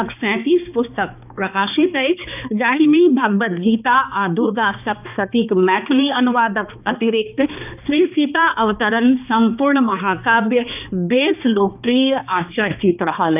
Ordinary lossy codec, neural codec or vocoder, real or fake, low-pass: none; codec, 16 kHz, 2 kbps, X-Codec, HuBERT features, trained on balanced general audio; fake; 3.6 kHz